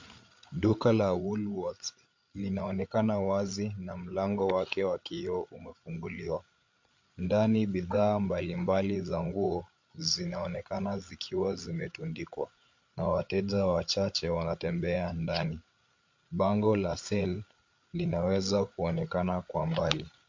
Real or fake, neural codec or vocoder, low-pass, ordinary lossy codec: fake; codec, 16 kHz, 8 kbps, FreqCodec, larger model; 7.2 kHz; MP3, 48 kbps